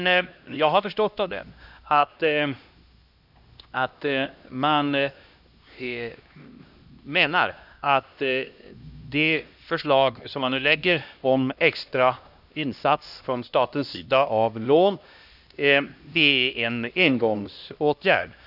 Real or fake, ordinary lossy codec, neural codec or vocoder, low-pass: fake; none; codec, 16 kHz, 1 kbps, X-Codec, HuBERT features, trained on LibriSpeech; 5.4 kHz